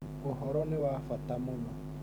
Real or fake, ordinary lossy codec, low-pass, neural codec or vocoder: fake; none; none; vocoder, 44.1 kHz, 128 mel bands every 512 samples, BigVGAN v2